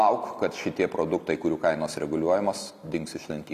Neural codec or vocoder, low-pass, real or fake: none; 14.4 kHz; real